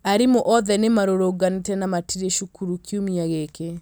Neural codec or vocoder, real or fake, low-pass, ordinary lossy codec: none; real; none; none